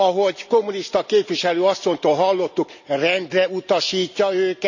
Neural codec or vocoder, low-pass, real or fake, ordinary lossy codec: none; 7.2 kHz; real; none